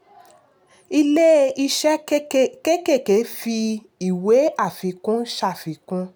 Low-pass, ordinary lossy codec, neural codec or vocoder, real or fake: none; none; none; real